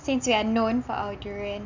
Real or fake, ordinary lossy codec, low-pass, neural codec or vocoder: real; none; 7.2 kHz; none